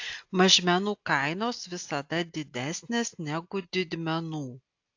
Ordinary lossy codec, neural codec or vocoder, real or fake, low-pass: AAC, 48 kbps; none; real; 7.2 kHz